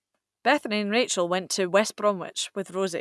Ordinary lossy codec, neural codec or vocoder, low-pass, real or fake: none; none; none; real